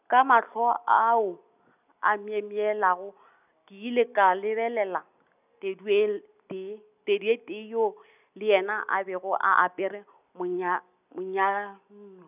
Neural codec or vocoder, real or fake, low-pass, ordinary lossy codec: none; real; 3.6 kHz; none